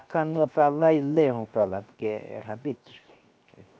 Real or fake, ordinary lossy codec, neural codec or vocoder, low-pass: fake; none; codec, 16 kHz, 0.7 kbps, FocalCodec; none